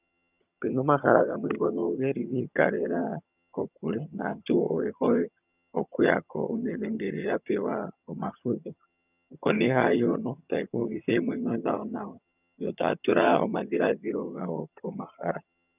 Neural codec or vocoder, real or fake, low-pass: vocoder, 22.05 kHz, 80 mel bands, HiFi-GAN; fake; 3.6 kHz